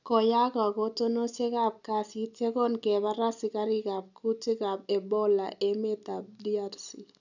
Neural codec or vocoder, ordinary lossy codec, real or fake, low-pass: none; none; real; 7.2 kHz